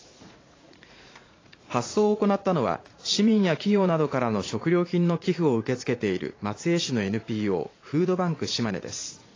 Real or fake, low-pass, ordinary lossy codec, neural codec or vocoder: fake; 7.2 kHz; AAC, 32 kbps; vocoder, 44.1 kHz, 80 mel bands, Vocos